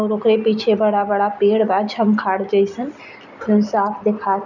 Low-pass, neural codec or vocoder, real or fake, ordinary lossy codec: 7.2 kHz; none; real; none